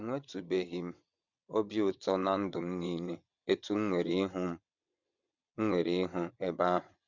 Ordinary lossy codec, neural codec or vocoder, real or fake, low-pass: none; vocoder, 22.05 kHz, 80 mel bands, WaveNeXt; fake; 7.2 kHz